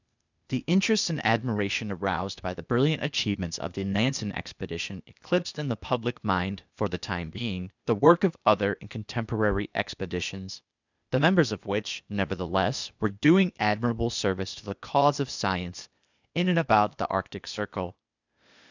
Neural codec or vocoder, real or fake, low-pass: codec, 16 kHz, 0.8 kbps, ZipCodec; fake; 7.2 kHz